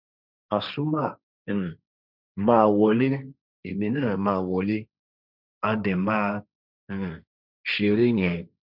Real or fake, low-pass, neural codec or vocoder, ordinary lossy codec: fake; 5.4 kHz; codec, 16 kHz, 1.1 kbps, Voila-Tokenizer; none